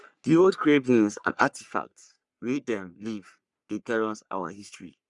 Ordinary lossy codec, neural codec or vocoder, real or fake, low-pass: Opus, 64 kbps; codec, 44.1 kHz, 3.4 kbps, Pupu-Codec; fake; 10.8 kHz